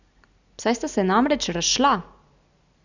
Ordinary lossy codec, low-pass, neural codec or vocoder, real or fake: none; 7.2 kHz; none; real